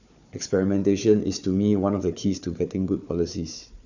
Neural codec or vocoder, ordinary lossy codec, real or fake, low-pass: codec, 16 kHz, 4 kbps, FunCodec, trained on Chinese and English, 50 frames a second; none; fake; 7.2 kHz